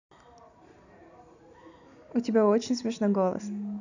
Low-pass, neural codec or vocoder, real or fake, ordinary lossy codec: 7.2 kHz; none; real; none